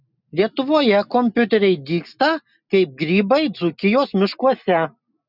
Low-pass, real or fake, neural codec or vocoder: 5.4 kHz; real; none